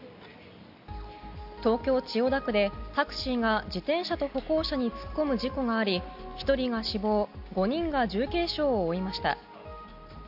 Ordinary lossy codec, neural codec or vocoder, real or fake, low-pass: AAC, 48 kbps; none; real; 5.4 kHz